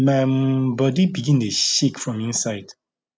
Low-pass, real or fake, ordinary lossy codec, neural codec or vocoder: none; real; none; none